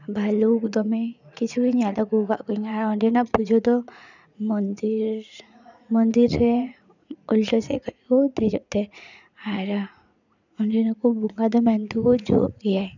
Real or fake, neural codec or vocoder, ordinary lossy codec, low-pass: fake; vocoder, 44.1 kHz, 80 mel bands, Vocos; none; 7.2 kHz